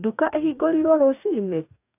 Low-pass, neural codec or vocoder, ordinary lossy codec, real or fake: 3.6 kHz; codec, 44.1 kHz, 2.6 kbps, DAC; none; fake